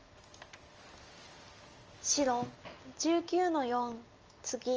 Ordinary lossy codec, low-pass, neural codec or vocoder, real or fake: Opus, 24 kbps; 7.2 kHz; none; real